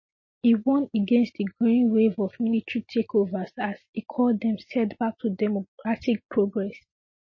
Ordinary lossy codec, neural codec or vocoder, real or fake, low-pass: MP3, 32 kbps; none; real; 7.2 kHz